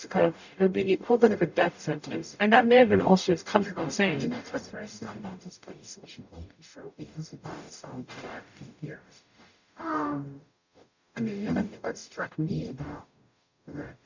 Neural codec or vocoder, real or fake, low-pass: codec, 44.1 kHz, 0.9 kbps, DAC; fake; 7.2 kHz